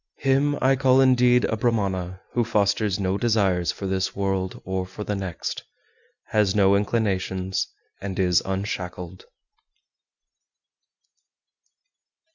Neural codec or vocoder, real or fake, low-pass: none; real; 7.2 kHz